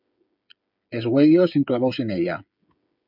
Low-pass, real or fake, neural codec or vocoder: 5.4 kHz; fake; codec, 16 kHz, 8 kbps, FreqCodec, smaller model